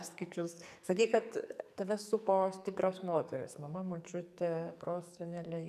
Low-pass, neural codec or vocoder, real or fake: 14.4 kHz; codec, 44.1 kHz, 2.6 kbps, SNAC; fake